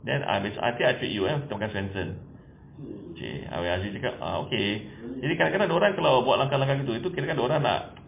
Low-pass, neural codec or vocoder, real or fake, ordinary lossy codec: 3.6 kHz; none; real; MP3, 24 kbps